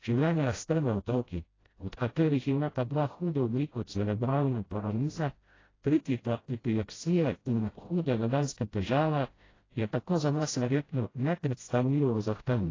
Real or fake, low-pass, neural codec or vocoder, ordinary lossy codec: fake; 7.2 kHz; codec, 16 kHz, 0.5 kbps, FreqCodec, smaller model; AAC, 32 kbps